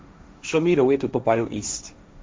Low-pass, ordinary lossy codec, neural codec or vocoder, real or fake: none; none; codec, 16 kHz, 1.1 kbps, Voila-Tokenizer; fake